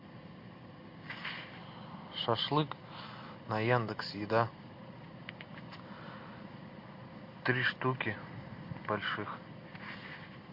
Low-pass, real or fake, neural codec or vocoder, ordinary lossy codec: 5.4 kHz; real; none; MP3, 32 kbps